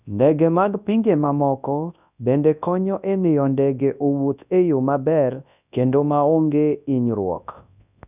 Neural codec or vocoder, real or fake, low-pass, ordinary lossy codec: codec, 24 kHz, 0.9 kbps, WavTokenizer, large speech release; fake; 3.6 kHz; none